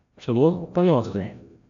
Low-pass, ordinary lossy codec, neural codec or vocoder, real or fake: 7.2 kHz; AAC, 64 kbps; codec, 16 kHz, 0.5 kbps, FreqCodec, larger model; fake